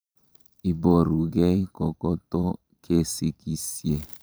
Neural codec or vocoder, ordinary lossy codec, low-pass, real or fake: vocoder, 44.1 kHz, 128 mel bands every 512 samples, BigVGAN v2; none; none; fake